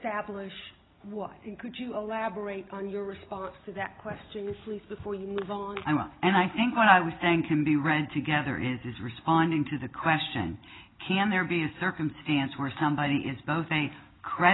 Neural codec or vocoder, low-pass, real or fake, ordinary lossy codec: none; 7.2 kHz; real; AAC, 16 kbps